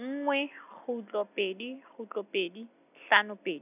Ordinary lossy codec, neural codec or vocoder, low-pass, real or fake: none; none; 3.6 kHz; real